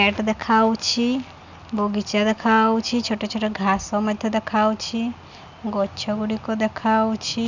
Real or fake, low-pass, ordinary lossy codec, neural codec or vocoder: real; 7.2 kHz; none; none